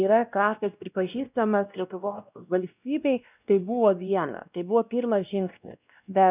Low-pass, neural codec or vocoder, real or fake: 3.6 kHz; codec, 16 kHz, 1 kbps, X-Codec, WavLM features, trained on Multilingual LibriSpeech; fake